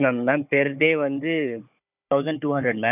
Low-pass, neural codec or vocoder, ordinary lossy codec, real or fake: 3.6 kHz; codec, 16 kHz, 4 kbps, FunCodec, trained on Chinese and English, 50 frames a second; none; fake